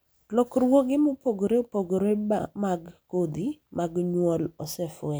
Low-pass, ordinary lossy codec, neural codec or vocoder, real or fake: none; none; none; real